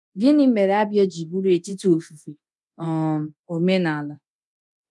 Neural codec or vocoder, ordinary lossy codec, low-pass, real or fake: codec, 24 kHz, 0.9 kbps, DualCodec; none; none; fake